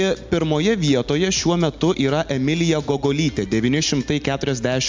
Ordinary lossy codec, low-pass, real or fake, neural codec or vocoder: MP3, 64 kbps; 7.2 kHz; real; none